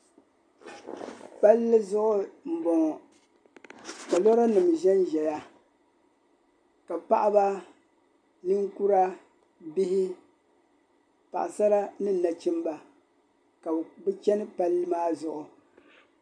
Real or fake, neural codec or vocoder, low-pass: real; none; 9.9 kHz